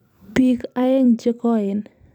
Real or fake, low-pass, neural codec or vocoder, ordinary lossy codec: fake; 19.8 kHz; vocoder, 44.1 kHz, 128 mel bands every 256 samples, BigVGAN v2; none